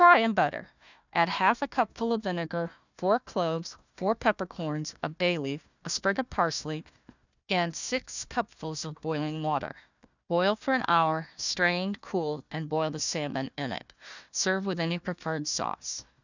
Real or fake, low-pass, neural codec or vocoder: fake; 7.2 kHz; codec, 16 kHz, 1 kbps, FunCodec, trained on Chinese and English, 50 frames a second